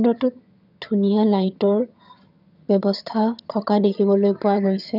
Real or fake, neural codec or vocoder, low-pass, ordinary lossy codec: fake; vocoder, 22.05 kHz, 80 mel bands, HiFi-GAN; 5.4 kHz; none